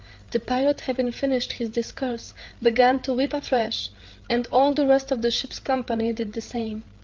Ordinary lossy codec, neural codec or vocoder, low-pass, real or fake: Opus, 32 kbps; codec, 16 kHz, 8 kbps, FreqCodec, larger model; 7.2 kHz; fake